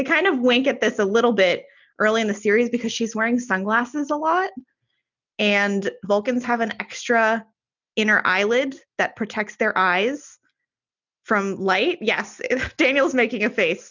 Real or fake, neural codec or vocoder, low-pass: real; none; 7.2 kHz